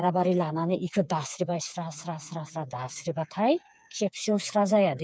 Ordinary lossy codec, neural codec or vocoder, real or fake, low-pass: none; codec, 16 kHz, 4 kbps, FreqCodec, smaller model; fake; none